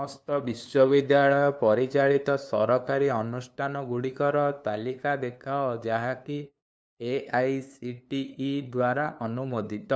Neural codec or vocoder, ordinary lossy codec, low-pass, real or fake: codec, 16 kHz, 2 kbps, FunCodec, trained on LibriTTS, 25 frames a second; none; none; fake